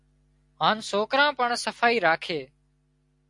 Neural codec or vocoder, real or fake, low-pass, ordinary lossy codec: none; real; 10.8 kHz; MP3, 64 kbps